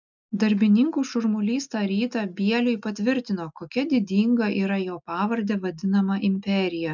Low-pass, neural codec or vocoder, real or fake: 7.2 kHz; none; real